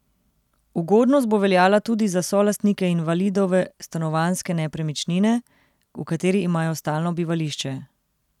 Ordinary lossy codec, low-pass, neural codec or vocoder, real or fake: none; 19.8 kHz; none; real